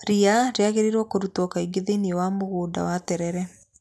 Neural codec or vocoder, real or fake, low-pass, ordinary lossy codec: none; real; none; none